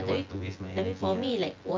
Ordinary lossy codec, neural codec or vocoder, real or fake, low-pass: Opus, 24 kbps; vocoder, 24 kHz, 100 mel bands, Vocos; fake; 7.2 kHz